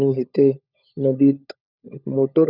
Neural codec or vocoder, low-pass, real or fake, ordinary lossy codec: codec, 16 kHz, 16 kbps, FunCodec, trained on LibriTTS, 50 frames a second; 5.4 kHz; fake; none